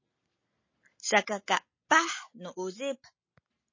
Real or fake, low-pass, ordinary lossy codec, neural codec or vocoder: real; 7.2 kHz; MP3, 32 kbps; none